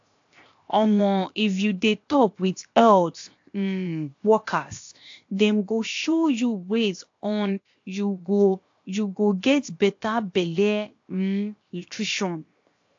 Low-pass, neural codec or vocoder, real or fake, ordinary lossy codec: 7.2 kHz; codec, 16 kHz, 0.7 kbps, FocalCodec; fake; AAC, 48 kbps